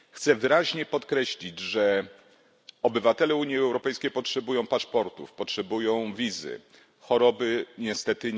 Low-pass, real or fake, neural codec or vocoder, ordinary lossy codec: none; real; none; none